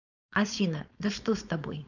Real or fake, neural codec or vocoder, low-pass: fake; codec, 16 kHz, 4.8 kbps, FACodec; 7.2 kHz